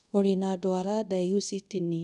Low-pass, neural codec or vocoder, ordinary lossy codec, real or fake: 10.8 kHz; codec, 24 kHz, 0.5 kbps, DualCodec; none; fake